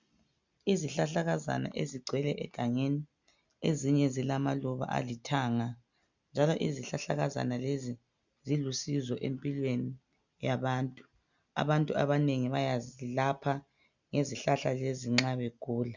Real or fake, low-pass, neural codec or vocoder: real; 7.2 kHz; none